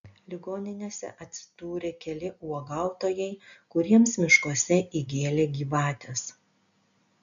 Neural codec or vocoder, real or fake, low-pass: none; real; 7.2 kHz